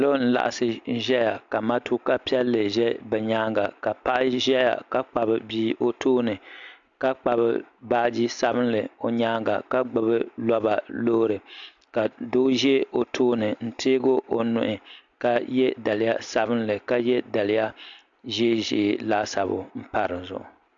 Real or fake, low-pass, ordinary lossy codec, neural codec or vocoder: real; 7.2 kHz; MP3, 64 kbps; none